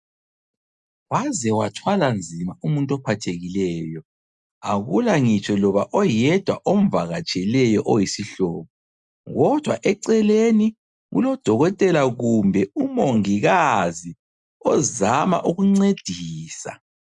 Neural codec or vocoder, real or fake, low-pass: none; real; 10.8 kHz